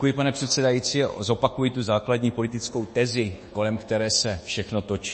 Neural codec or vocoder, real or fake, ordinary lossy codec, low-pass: codec, 24 kHz, 1.2 kbps, DualCodec; fake; MP3, 32 kbps; 9.9 kHz